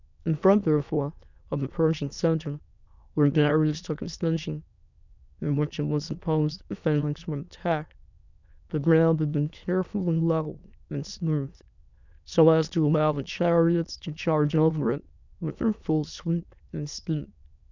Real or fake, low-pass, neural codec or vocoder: fake; 7.2 kHz; autoencoder, 22.05 kHz, a latent of 192 numbers a frame, VITS, trained on many speakers